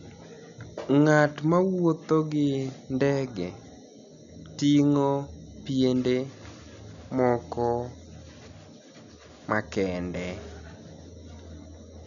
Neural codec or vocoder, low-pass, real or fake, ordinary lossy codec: none; 7.2 kHz; real; none